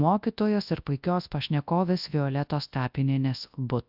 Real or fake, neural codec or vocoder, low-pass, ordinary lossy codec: fake; codec, 24 kHz, 0.9 kbps, WavTokenizer, large speech release; 5.4 kHz; AAC, 48 kbps